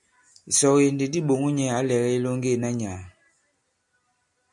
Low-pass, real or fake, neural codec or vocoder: 10.8 kHz; real; none